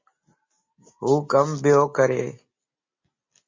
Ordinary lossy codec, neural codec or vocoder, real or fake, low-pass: MP3, 32 kbps; none; real; 7.2 kHz